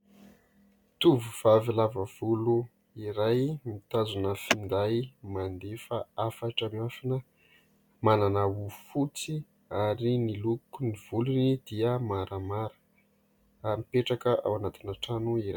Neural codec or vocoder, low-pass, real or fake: none; 19.8 kHz; real